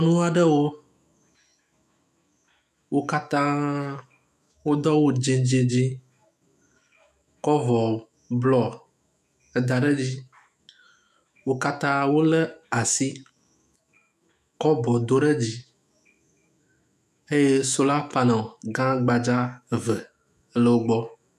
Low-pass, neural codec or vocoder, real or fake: 14.4 kHz; autoencoder, 48 kHz, 128 numbers a frame, DAC-VAE, trained on Japanese speech; fake